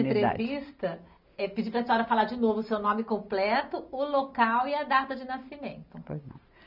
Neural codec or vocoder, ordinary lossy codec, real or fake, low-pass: none; none; real; 5.4 kHz